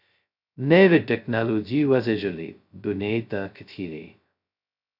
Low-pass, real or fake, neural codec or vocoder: 5.4 kHz; fake; codec, 16 kHz, 0.2 kbps, FocalCodec